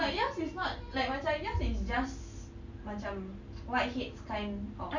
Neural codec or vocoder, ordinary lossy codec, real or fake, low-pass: none; none; real; 7.2 kHz